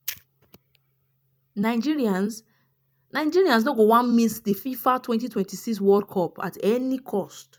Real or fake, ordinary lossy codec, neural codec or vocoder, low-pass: fake; none; vocoder, 44.1 kHz, 128 mel bands every 256 samples, BigVGAN v2; 19.8 kHz